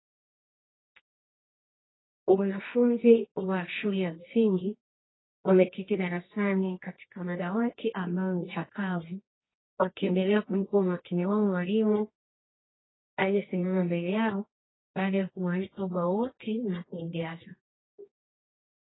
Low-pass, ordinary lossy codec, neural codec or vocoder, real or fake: 7.2 kHz; AAC, 16 kbps; codec, 24 kHz, 0.9 kbps, WavTokenizer, medium music audio release; fake